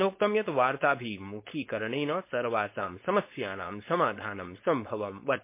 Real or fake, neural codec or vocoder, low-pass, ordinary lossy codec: fake; codec, 16 kHz, 4.8 kbps, FACodec; 3.6 kHz; MP3, 24 kbps